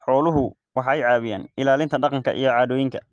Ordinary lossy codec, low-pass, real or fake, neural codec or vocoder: Opus, 24 kbps; 9.9 kHz; real; none